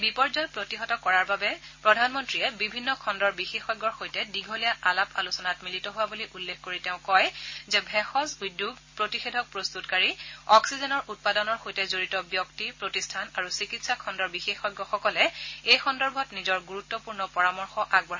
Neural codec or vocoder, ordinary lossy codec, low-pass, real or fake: none; MP3, 32 kbps; 7.2 kHz; real